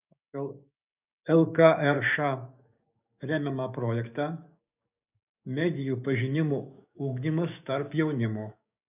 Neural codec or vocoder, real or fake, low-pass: codec, 16 kHz, 6 kbps, DAC; fake; 3.6 kHz